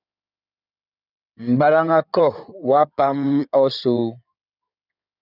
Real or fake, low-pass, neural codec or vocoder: fake; 5.4 kHz; codec, 16 kHz in and 24 kHz out, 2.2 kbps, FireRedTTS-2 codec